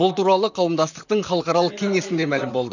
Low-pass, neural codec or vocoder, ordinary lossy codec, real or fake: 7.2 kHz; vocoder, 44.1 kHz, 128 mel bands, Pupu-Vocoder; none; fake